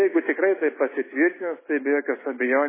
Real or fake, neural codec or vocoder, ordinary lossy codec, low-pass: real; none; MP3, 16 kbps; 3.6 kHz